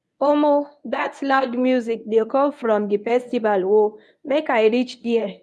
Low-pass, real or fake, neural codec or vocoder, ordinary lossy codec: none; fake; codec, 24 kHz, 0.9 kbps, WavTokenizer, medium speech release version 1; none